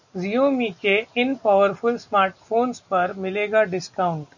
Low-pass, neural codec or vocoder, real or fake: 7.2 kHz; none; real